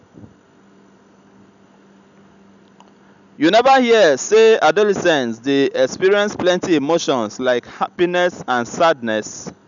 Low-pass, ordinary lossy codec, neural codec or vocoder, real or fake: 7.2 kHz; none; none; real